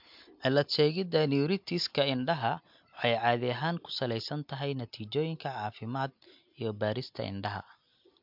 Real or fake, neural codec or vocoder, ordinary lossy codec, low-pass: real; none; MP3, 48 kbps; 5.4 kHz